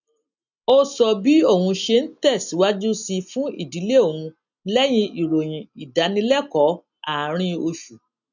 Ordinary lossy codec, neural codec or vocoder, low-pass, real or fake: none; none; 7.2 kHz; real